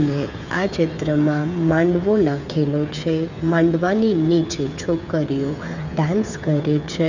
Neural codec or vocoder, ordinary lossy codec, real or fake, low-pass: codec, 16 kHz, 8 kbps, FreqCodec, smaller model; none; fake; 7.2 kHz